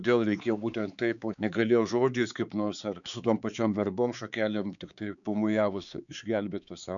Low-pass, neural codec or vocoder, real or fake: 7.2 kHz; codec, 16 kHz, 4 kbps, X-Codec, HuBERT features, trained on balanced general audio; fake